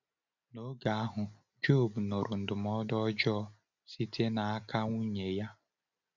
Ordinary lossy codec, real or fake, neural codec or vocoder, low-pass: none; real; none; 7.2 kHz